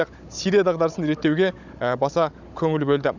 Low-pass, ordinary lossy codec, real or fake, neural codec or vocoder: 7.2 kHz; none; fake; codec, 16 kHz, 16 kbps, FunCodec, trained on Chinese and English, 50 frames a second